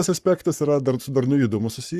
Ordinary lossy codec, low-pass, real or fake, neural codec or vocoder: Opus, 64 kbps; 14.4 kHz; fake; codec, 44.1 kHz, 7.8 kbps, Pupu-Codec